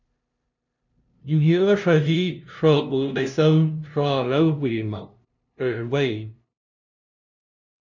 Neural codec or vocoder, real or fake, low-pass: codec, 16 kHz, 0.5 kbps, FunCodec, trained on LibriTTS, 25 frames a second; fake; 7.2 kHz